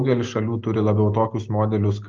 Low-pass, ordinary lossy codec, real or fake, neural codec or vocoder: 7.2 kHz; Opus, 24 kbps; real; none